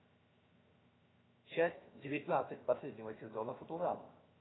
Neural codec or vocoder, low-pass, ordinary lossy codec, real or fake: codec, 16 kHz, 0.7 kbps, FocalCodec; 7.2 kHz; AAC, 16 kbps; fake